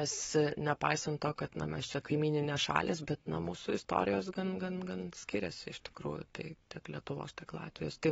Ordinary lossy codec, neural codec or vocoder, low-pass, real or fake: AAC, 24 kbps; codec, 44.1 kHz, 7.8 kbps, Pupu-Codec; 19.8 kHz; fake